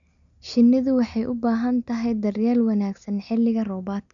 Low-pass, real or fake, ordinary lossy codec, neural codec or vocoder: 7.2 kHz; real; MP3, 96 kbps; none